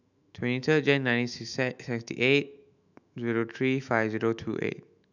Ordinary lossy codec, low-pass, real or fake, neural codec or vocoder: none; 7.2 kHz; fake; autoencoder, 48 kHz, 128 numbers a frame, DAC-VAE, trained on Japanese speech